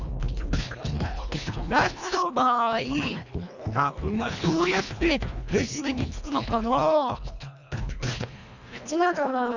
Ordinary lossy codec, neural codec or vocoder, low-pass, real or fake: none; codec, 24 kHz, 1.5 kbps, HILCodec; 7.2 kHz; fake